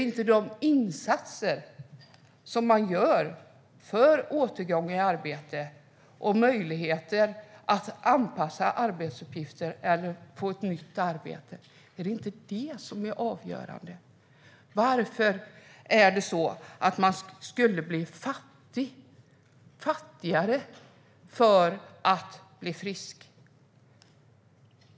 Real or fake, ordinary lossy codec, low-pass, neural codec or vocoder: real; none; none; none